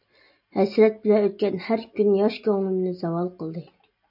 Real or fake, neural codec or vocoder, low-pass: real; none; 5.4 kHz